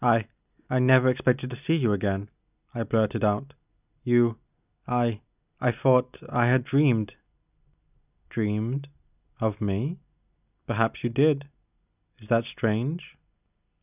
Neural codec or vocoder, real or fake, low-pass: none; real; 3.6 kHz